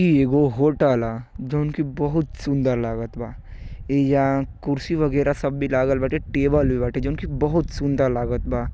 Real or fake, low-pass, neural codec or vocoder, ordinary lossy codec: real; none; none; none